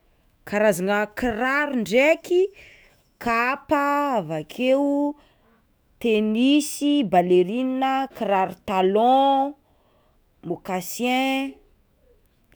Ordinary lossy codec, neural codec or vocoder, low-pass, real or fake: none; autoencoder, 48 kHz, 128 numbers a frame, DAC-VAE, trained on Japanese speech; none; fake